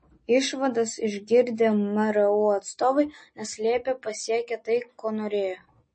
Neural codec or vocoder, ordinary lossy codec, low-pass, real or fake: none; MP3, 32 kbps; 9.9 kHz; real